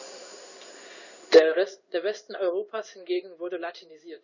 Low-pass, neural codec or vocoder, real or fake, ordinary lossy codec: 7.2 kHz; codec, 16 kHz in and 24 kHz out, 1 kbps, XY-Tokenizer; fake; none